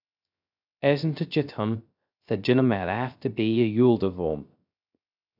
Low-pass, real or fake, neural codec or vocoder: 5.4 kHz; fake; codec, 16 kHz, 0.3 kbps, FocalCodec